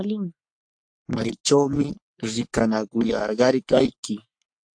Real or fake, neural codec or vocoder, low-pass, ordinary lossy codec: fake; codec, 44.1 kHz, 3.4 kbps, Pupu-Codec; 9.9 kHz; AAC, 64 kbps